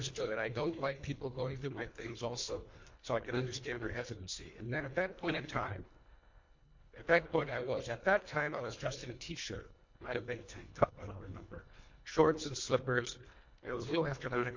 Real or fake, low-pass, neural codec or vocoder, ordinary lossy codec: fake; 7.2 kHz; codec, 24 kHz, 1.5 kbps, HILCodec; MP3, 48 kbps